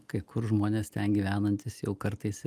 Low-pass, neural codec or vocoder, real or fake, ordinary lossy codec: 14.4 kHz; none; real; Opus, 32 kbps